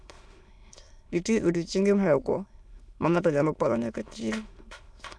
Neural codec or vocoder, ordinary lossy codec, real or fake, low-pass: autoencoder, 22.05 kHz, a latent of 192 numbers a frame, VITS, trained on many speakers; none; fake; none